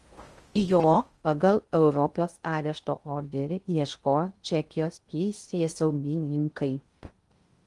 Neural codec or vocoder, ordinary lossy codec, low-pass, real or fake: codec, 16 kHz in and 24 kHz out, 0.6 kbps, FocalCodec, streaming, 2048 codes; Opus, 24 kbps; 10.8 kHz; fake